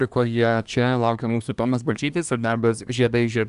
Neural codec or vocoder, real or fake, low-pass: codec, 24 kHz, 1 kbps, SNAC; fake; 10.8 kHz